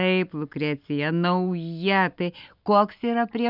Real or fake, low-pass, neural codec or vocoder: real; 5.4 kHz; none